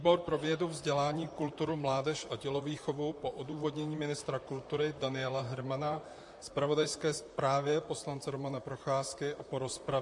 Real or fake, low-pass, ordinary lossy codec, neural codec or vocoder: fake; 10.8 kHz; MP3, 48 kbps; vocoder, 44.1 kHz, 128 mel bands, Pupu-Vocoder